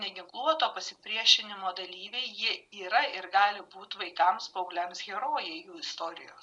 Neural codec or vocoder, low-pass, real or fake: none; 10.8 kHz; real